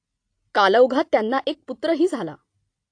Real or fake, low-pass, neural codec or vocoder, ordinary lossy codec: real; 9.9 kHz; none; AAC, 48 kbps